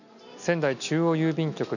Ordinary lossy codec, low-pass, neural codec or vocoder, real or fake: none; 7.2 kHz; none; real